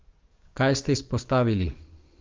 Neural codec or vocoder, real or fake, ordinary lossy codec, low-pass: codec, 44.1 kHz, 7.8 kbps, DAC; fake; Opus, 32 kbps; 7.2 kHz